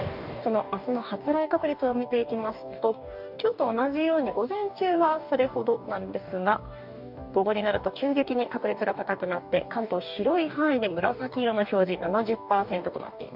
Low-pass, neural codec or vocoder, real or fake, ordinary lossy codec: 5.4 kHz; codec, 44.1 kHz, 2.6 kbps, DAC; fake; none